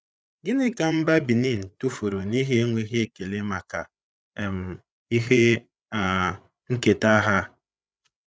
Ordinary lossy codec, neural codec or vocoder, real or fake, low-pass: none; codec, 16 kHz, 8 kbps, FreqCodec, larger model; fake; none